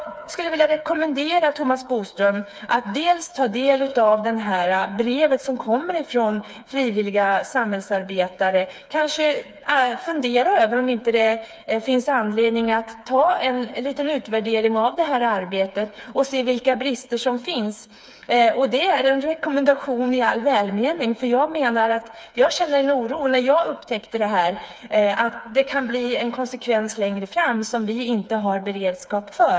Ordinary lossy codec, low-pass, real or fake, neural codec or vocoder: none; none; fake; codec, 16 kHz, 4 kbps, FreqCodec, smaller model